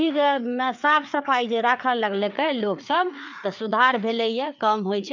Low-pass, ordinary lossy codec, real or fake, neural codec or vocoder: 7.2 kHz; none; fake; codec, 16 kHz, 4 kbps, FreqCodec, larger model